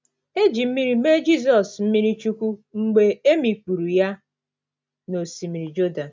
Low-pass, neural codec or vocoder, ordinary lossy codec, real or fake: none; none; none; real